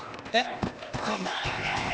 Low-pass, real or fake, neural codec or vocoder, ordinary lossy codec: none; fake; codec, 16 kHz, 0.8 kbps, ZipCodec; none